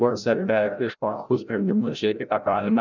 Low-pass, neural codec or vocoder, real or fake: 7.2 kHz; codec, 16 kHz, 0.5 kbps, FreqCodec, larger model; fake